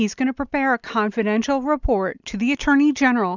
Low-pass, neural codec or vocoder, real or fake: 7.2 kHz; none; real